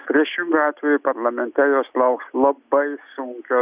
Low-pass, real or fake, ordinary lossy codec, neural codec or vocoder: 3.6 kHz; real; Opus, 64 kbps; none